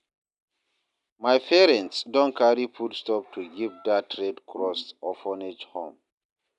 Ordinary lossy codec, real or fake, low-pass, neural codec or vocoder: none; real; 10.8 kHz; none